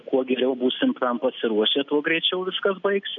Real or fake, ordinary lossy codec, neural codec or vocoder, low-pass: real; AAC, 64 kbps; none; 7.2 kHz